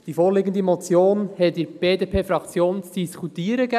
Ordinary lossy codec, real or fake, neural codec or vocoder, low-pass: none; real; none; 14.4 kHz